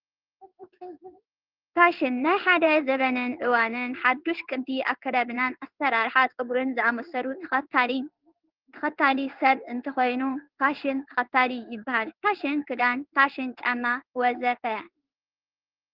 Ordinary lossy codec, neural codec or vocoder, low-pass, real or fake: Opus, 32 kbps; codec, 16 kHz in and 24 kHz out, 1 kbps, XY-Tokenizer; 5.4 kHz; fake